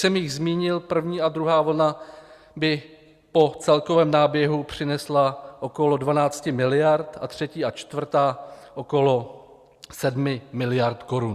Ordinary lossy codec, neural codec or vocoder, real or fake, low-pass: Opus, 64 kbps; none; real; 14.4 kHz